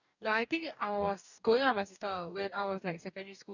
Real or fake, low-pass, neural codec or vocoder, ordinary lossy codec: fake; 7.2 kHz; codec, 44.1 kHz, 2.6 kbps, DAC; none